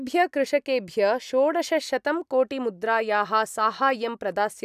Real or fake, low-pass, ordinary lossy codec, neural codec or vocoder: real; 14.4 kHz; none; none